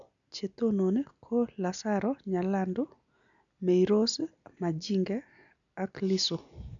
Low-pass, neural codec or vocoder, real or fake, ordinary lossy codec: 7.2 kHz; none; real; none